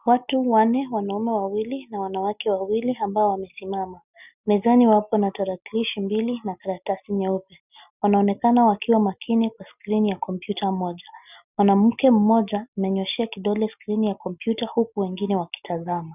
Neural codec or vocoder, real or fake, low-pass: none; real; 3.6 kHz